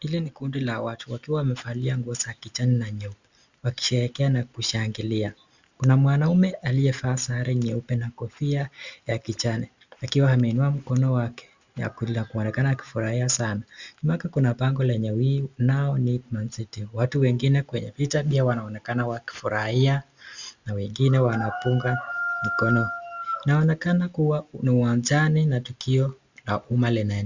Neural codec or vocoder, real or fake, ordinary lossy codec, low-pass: none; real; Opus, 64 kbps; 7.2 kHz